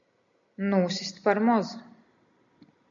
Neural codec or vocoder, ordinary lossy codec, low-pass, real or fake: none; AAC, 64 kbps; 7.2 kHz; real